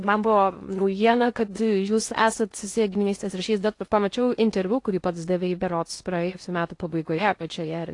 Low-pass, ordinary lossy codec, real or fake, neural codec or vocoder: 10.8 kHz; AAC, 48 kbps; fake; codec, 16 kHz in and 24 kHz out, 0.6 kbps, FocalCodec, streaming, 2048 codes